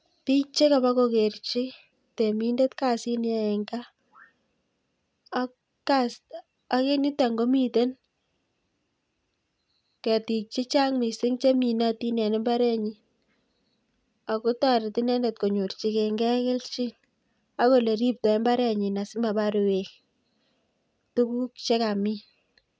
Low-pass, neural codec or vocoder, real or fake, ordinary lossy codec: none; none; real; none